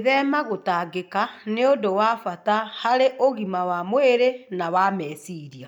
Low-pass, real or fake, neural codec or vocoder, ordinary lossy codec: 19.8 kHz; real; none; none